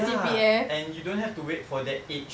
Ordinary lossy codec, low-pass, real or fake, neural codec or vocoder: none; none; real; none